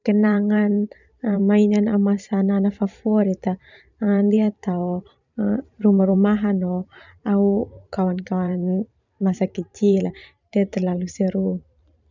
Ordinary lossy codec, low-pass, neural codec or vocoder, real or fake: none; 7.2 kHz; vocoder, 44.1 kHz, 128 mel bands every 512 samples, BigVGAN v2; fake